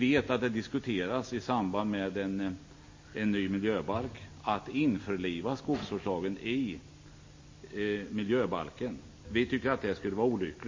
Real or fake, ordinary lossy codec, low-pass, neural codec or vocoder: real; MP3, 32 kbps; 7.2 kHz; none